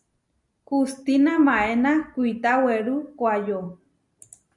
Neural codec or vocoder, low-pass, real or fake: none; 10.8 kHz; real